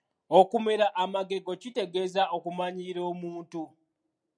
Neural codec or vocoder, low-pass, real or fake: none; 9.9 kHz; real